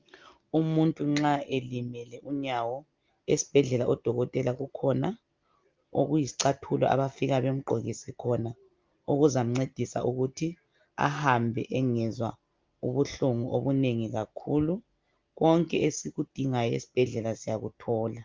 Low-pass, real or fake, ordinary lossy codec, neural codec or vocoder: 7.2 kHz; real; Opus, 32 kbps; none